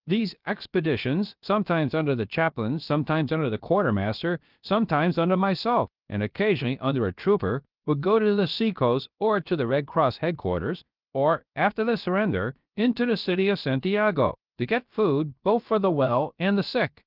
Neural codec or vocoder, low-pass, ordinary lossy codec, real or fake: codec, 16 kHz, about 1 kbps, DyCAST, with the encoder's durations; 5.4 kHz; Opus, 32 kbps; fake